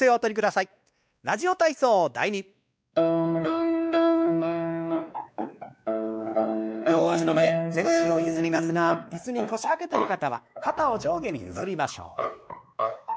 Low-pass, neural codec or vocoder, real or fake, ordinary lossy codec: none; codec, 16 kHz, 2 kbps, X-Codec, WavLM features, trained on Multilingual LibriSpeech; fake; none